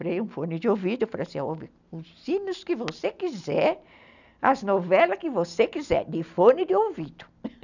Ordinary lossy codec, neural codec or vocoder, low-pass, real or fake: none; none; 7.2 kHz; real